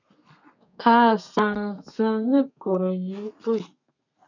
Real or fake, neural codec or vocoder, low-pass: fake; codec, 32 kHz, 1.9 kbps, SNAC; 7.2 kHz